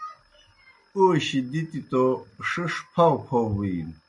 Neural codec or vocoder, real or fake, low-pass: none; real; 10.8 kHz